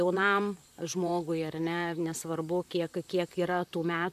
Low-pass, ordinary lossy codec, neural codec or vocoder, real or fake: 14.4 kHz; AAC, 96 kbps; vocoder, 44.1 kHz, 128 mel bands, Pupu-Vocoder; fake